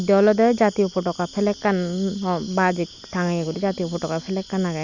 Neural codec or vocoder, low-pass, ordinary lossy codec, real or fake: none; 7.2 kHz; Opus, 64 kbps; real